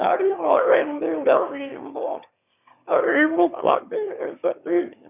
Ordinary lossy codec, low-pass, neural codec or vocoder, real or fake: none; 3.6 kHz; autoencoder, 22.05 kHz, a latent of 192 numbers a frame, VITS, trained on one speaker; fake